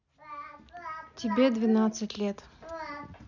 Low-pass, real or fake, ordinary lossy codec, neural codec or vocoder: 7.2 kHz; real; none; none